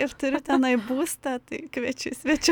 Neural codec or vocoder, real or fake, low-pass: none; real; 19.8 kHz